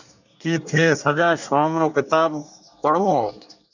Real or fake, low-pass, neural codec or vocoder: fake; 7.2 kHz; codec, 24 kHz, 1 kbps, SNAC